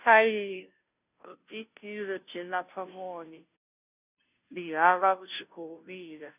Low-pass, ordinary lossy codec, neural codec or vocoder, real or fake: 3.6 kHz; none; codec, 16 kHz, 0.5 kbps, FunCodec, trained on Chinese and English, 25 frames a second; fake